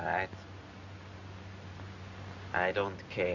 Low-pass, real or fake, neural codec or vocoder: 7.2 kHz; real; none